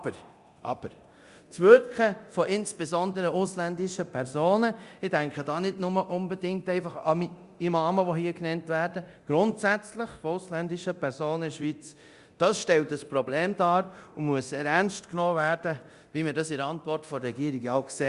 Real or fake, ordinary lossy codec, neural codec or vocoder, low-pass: fake; Opus, 64 kbps; codec, 24 kHz, 0.9 kbps, DualCodec; 10.8 kHz